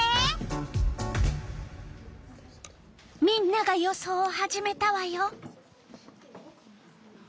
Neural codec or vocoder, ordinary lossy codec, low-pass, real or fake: none; none; none; real